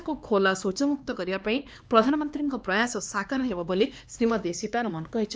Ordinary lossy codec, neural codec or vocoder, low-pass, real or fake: none; codec, 16 kHz, 2 kbps, X-Codec, HuBERT features, trained on LibriSpeech; none; fake